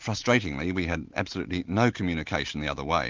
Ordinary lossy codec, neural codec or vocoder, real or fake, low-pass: Opus, 32 kbps; none; real; 7.2 kHz